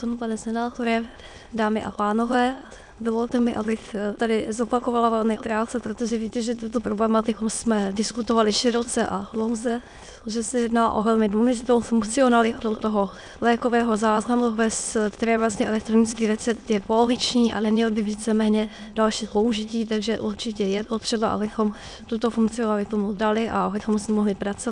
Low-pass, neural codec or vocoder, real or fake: 9.9 kHz; autoencoder, 22.05 kHz, a latent of 192 numbers a frame, VITS, trained on many speakers; fake